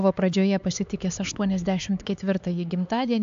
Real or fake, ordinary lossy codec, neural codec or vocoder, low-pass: fake; AAC, 64 kbps; codec, 16 kHz, 4 kbps, X-Codec, HuBERT features, trained on LibriSpeech; 7.2 kHz